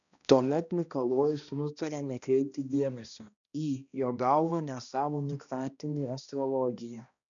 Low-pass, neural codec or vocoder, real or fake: 7.2 kHz; codec, 16 kHz, 1 kbps, X-Codec, HuBERT features, trained on balanced general audio; fake